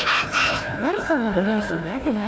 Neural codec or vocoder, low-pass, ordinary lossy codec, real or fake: codec, 16 kHz, 1 kbps, FunCodec, trained on Chinese and English, 50 frames a second; none; none; fake